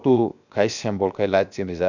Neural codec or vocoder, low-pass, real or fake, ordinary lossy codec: codec, 16 kHz, 0.7 kbps, FocalCodec; 7.2 kHz; fake; none